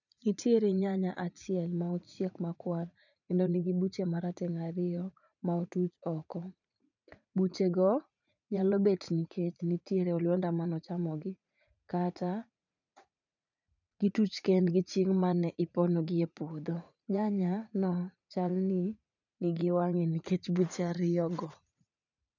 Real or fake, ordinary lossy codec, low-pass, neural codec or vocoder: fake; none; 7.2 kHz; vocoder, 44.1 kHz, 80 mel bands, Vocos